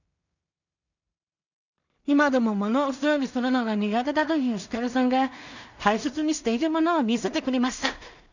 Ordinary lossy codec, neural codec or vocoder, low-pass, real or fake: none; codec, 16 kHz in and 24 kHz out, 0.4 kbps, LongCat-Audio-Codec, two codebook decoder; 7.2 kHz; fake